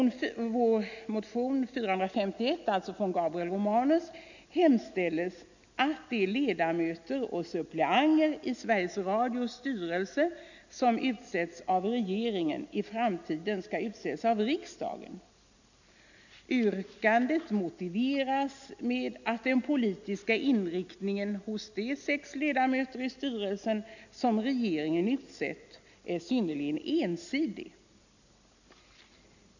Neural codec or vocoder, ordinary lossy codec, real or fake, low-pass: none; none; real; 7.2 kHz